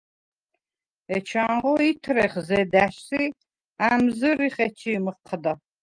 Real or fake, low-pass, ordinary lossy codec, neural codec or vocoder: real; 9.9 kHz; Opus, 32 kbps; none